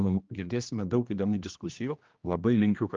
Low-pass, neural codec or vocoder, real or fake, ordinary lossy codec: 7.2 kHz; codec, 16 kHz, 1 kbps, X-Codec, HuBERT features, trained on general audio; fake; Opus, 24 kbps